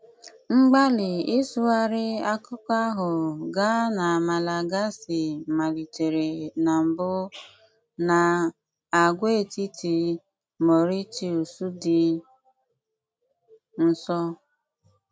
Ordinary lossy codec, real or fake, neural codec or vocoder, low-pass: none; real; none; none